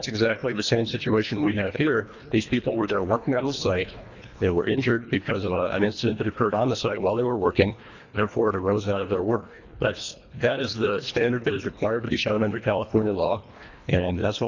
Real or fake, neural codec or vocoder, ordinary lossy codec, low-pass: fake; codec, 24 kHz, 1.5 kbps, HILCodec; Opus, 64 kbps; 7.2 kHz